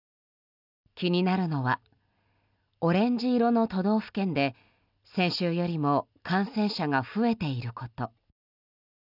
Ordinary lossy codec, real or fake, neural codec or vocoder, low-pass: none; real; none; 5.4 kHz